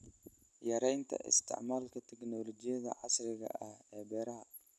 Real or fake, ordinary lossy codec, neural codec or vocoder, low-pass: real; none; none; none